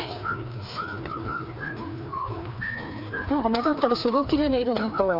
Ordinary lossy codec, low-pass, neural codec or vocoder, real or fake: none; 5.4 kHz; codec, 16 kHz, 2 kbps, FreqCodec, larger model; fake